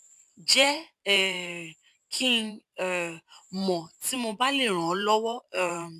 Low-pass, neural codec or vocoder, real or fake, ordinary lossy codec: 14.4 kHz; vocoder, 44.1 kHz, 128 mel bands every 512 samples, BigVGAN v2; fake; none